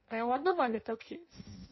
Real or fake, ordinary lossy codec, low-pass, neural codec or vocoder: fake; MP3, 24 kbps; 7.2 kHz; codec, 16 kHz in and 24 kHz out, 0.6 kbps, FireRedTTS-2 codec